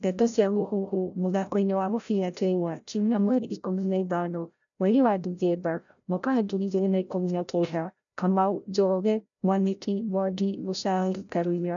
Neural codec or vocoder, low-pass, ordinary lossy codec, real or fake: codec, 16 kHz, 0.5 kbps, FreqCodec, larger model; 7.2 kHz; none; fake